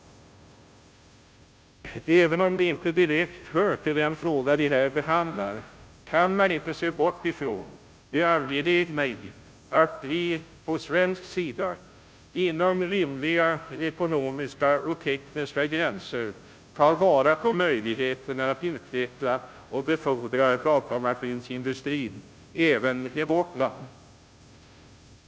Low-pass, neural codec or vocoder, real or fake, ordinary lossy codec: none; codec, 16 kHz, 0.5 kbps, FunCodec, trained on Chinese and English, 25 frames a second; fake; none